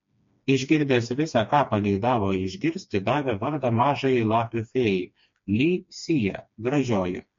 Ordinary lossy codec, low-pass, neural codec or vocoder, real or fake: MP3, 48 kbps; 7.2 kHz; codec, 16 kHz, 2 kbps, FreqCodec, smaller model; fake